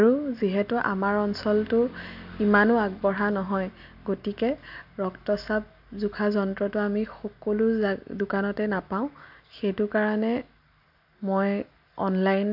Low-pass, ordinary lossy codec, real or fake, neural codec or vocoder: 5.4 kHz; AAC, 48 kbps; real; none